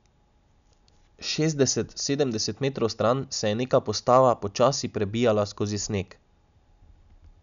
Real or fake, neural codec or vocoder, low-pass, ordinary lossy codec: real; none; 7.2 kHz; none